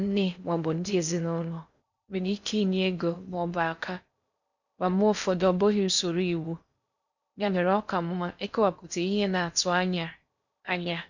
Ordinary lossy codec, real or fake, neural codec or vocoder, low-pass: none; fake; codec, 16 kHz in and 24 kHz out, 0.6 kbps, FocalCodec, streaming, 4096 codes; 7.2 kHz